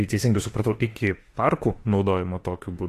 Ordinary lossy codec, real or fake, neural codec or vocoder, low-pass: AAC, 48 kbps; fake; autoencoder, 48 kHz, 32 numbers a frame, DAC-VAE, trained on Japanese speech; 14.4 kHz